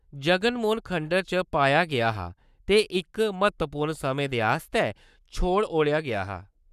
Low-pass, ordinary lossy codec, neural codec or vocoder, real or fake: 14.4 kHz; none; codec, 44.1 kHz, 7.8 kbps, Pupu-Codec; fake